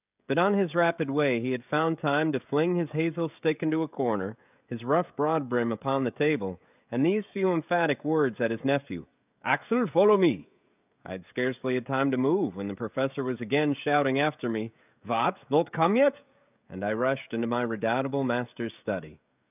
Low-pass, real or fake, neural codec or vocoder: 3.6 kHz; fake; codec, 16 kHz, 16 kbps, FreqCodec, smaller model